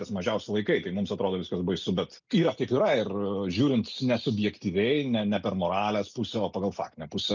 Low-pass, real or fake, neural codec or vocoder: 7.2 kHz; real; none